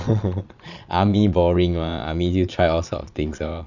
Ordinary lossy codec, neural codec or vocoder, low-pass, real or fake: none; vocoder, 22.05 kHz, 80 mel bands, Vocos; 7.2 kHz; fake